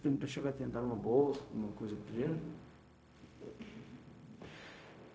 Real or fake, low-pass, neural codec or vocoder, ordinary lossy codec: fake; none; codec, 16 kHz, 0.4 kbps, LongCat-Audio-Codec; none